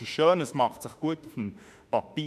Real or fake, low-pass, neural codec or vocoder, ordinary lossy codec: fake; 14.4 kHz; autoencoder, 48 kHz, 32 numbers a frame, DAC-VAE, trained on Japanese speech; none